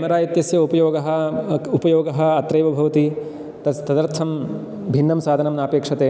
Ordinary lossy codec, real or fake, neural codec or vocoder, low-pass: none; real; none; none